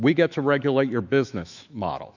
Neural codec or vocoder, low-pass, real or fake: autoencoder, 48 kHz, 128 numbers a frame, DAC-VAE, trained on Japanese speech; 7.2 kHz; fake